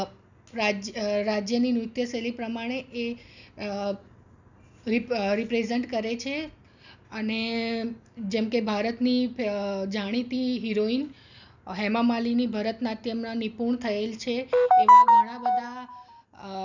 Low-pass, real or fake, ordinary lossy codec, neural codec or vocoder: 7.2 kHz; real; none; none